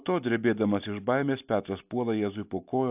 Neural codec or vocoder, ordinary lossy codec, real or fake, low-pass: none; AAC, 32 kbps; real; 3.6 kHz